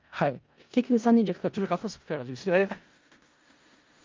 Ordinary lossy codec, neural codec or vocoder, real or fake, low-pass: Opus, 24 kbps; codec, 16 kHz in and 24 kHz out, 0.4 kbps, LongCat-Audio-Codec, four codebook decoder; fake; 7.2 kHz